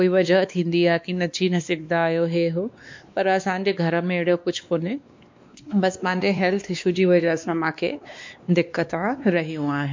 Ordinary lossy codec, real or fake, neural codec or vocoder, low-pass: MP3, 64 kbps; fake; codec, 16 kHz, 2 kbps, X-Codec, WavLM features, trained on Multilingual LibriSpeech; 7.2 kHz